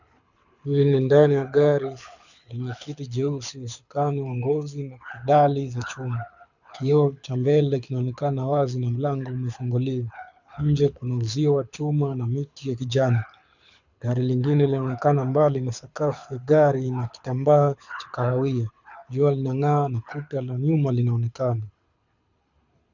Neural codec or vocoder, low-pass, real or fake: codec, 24 kHz, 6 kbps, HILCodec; 7.2 kHz; fake